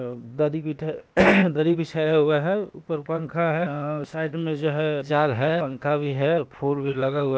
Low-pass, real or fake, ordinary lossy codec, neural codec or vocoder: none; fake; none; codec, 16 kHz, 0.8 kbps, ZipCodec